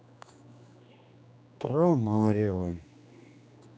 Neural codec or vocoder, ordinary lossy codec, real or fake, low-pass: codec, 16 kHz, 2 kbps, X-Codec, HuBERT features, trained on general audio; none; fake; none